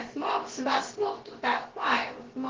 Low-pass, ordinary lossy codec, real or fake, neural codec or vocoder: 7.2 kHz; Opus, 16 kbps; fake; codec, 16 kHz, about 1 kbps, DyCAST, with the encoder's durations